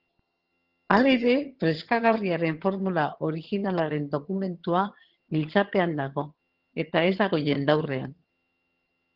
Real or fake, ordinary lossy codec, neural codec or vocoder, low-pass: fake; Opus, 16 kbps; vocoder, 22.05 kHz, 80 mel bands, HiFi-GAN; 5.4 kHz